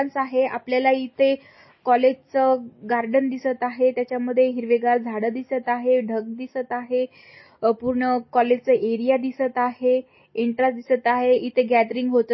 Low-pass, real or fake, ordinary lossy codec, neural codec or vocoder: 7.2 kHz; real; MP3, 24 kbps; none